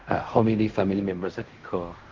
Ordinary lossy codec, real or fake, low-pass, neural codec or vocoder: Opus, 32 kbps; fake; 7.2 kHz; codec, 16 kHz in and 24 kHz out, 0.4 kbps, LongCat-Audio-Codec, fine tuned four codebook decoder